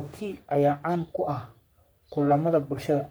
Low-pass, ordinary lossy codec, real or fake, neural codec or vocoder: none; none; fake; codec, 44.1 kHz, 3.4 kbps, Pupu-Codec